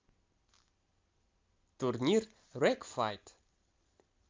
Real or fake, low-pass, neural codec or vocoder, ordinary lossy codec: real; 7.2 kHz; none; Opus, 24 kbps